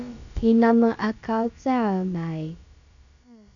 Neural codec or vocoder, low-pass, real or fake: codec, 16 kHz, about 1 kbps, DyCAST, with the encoder's durations; 7.2 kHz; fake